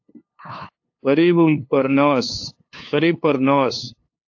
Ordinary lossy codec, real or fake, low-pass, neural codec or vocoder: AAC, 48 kbps; fake; 7.2 kHz; codec, 16 kHz, 2 kbps, FunCodec, trained on LibriTTS, 25 frames a second